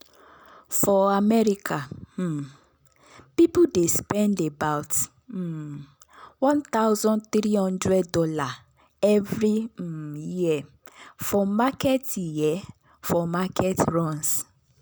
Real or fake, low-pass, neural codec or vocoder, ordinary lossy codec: real; none; none; none